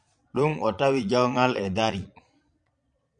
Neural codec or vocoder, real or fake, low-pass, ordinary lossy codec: vocoder, 22.05 kHz, 80 mel bands, Vocos; fake; 9.9 kHz; MP3, 96 kbps